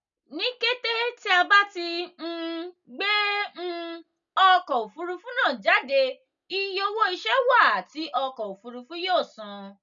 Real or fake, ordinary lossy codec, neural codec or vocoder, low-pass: real; none; none; 7.2 kHz